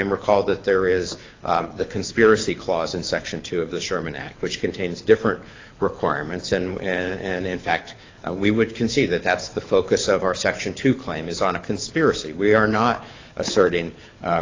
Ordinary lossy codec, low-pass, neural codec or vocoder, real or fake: AAC, 32 kbps; 7.2 kHz; codec, 24 kHz, 6 kbps, HILCodec; fake